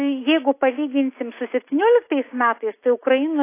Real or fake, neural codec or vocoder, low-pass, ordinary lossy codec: real; none; 3.6 kHz; AAC, 24 kbps